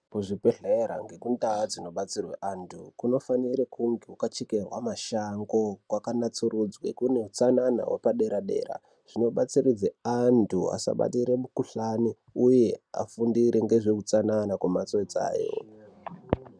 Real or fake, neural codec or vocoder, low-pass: real; none; 9.9 kHz